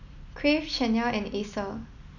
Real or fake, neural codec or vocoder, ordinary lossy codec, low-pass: real; none; none; 7.2 kHz